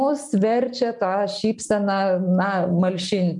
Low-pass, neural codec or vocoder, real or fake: 10.8 kHz; none; real